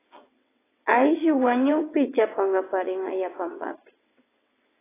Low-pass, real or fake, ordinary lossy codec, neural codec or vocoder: 3.6 kHz; fake; AAC, 16 kbps; vocoder, 22.05 kHz, 80 mel bands, WaveNeXt